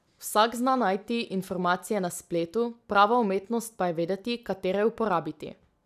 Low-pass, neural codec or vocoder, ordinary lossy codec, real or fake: 14.4 kHz; none; none; real